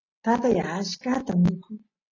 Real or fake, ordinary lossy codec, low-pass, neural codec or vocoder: real; AAC, 32 kbps; 7.2 kHz; none